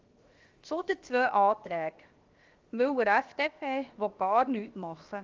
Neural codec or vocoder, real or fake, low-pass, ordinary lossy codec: codec, 16 kHz, 0.7 kbps, FocalCodec; fake; 7.2 kHz; Opus, 32 kbps